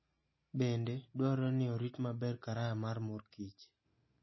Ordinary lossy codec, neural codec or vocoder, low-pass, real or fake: MP3, 24 kbps; none; 7.2 kHz; real